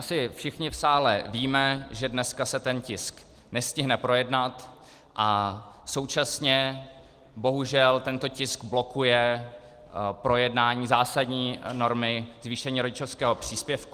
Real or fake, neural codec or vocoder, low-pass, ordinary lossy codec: real; none; 14.4 kHz; Opus, 24 kbps